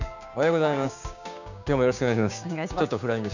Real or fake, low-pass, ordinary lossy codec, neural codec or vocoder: fake; 7.2 kHz; none; codec, 16 kHz, 6 kbps, DAC